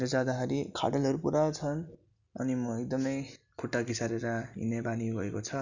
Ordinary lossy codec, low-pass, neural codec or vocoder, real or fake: none; 7.2 kHz; none; real